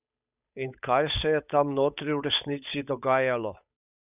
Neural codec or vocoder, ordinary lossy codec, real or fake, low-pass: codec, 16 kHz, 8 kbps, FunCodec, trained on Chinese and English, 25 frames a second; none; fake; 3.6 kHz